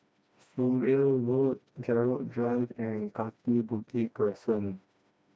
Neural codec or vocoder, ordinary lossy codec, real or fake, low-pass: codec, 16 kHz, 1 kbps, FreqCodec, smaller model; none; fake; none